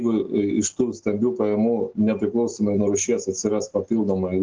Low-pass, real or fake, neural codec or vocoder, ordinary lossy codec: 7.2 kHz; real; none; Opus, 16 kbps